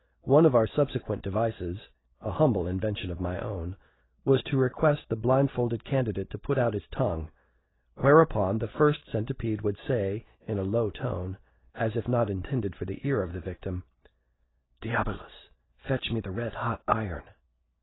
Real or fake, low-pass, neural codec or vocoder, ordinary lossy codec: real; 7.2 kHz; none; AAC, 16 kbps